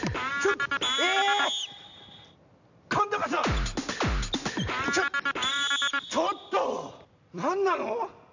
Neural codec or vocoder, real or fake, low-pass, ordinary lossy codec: none; real; 7.2 kHz; none